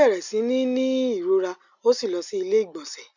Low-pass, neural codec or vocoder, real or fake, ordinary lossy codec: 7.2 kHz; none; real; none